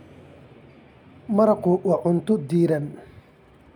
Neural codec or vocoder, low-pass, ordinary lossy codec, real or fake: none; 19.8 kHz; none; real